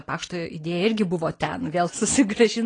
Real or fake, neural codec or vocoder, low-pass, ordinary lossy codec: real; none; 9.9 kHz; AAC, 32 kbps